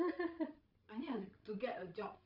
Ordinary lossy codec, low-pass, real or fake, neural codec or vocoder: none; 5.4 kHz; fake; codec, 16 kHz, 16 kbps, FreqCodec, larger model